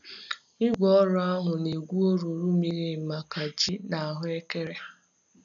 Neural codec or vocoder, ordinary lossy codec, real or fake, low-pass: none; none; real; 7.2 kHz